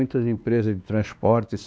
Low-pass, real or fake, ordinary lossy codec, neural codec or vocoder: none; fake; none; codec, 16 kHz, 2 kbps, X-Codec, WavLM features, trained on Multilingual LibriSpeech